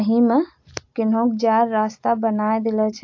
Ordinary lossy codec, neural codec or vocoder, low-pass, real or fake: AAC, 48 kbps; none; 7.2 kHz; real